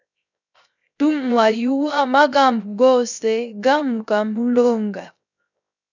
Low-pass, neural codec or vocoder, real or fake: 7.2 kHz; codec, 16 kHz, 0.3 kbps, FocalCodec; fake